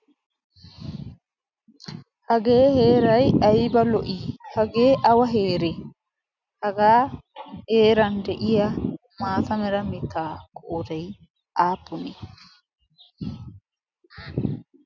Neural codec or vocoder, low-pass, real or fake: none; 7.2 kHz; real